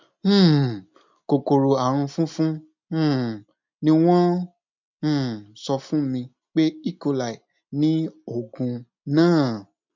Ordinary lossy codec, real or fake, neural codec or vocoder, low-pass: MP3, 64 kbps; real; none; 7.2 kHz